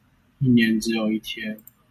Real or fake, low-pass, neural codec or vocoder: real; 14.4 kHz; none